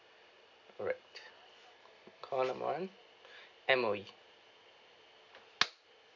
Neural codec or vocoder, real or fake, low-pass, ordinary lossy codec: none; real; 7.2 kHz; none